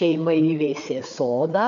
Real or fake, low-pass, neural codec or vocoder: fake; 7.2 kHz; codec, 16 kHz, 4 kbps, FreqCodec, larger model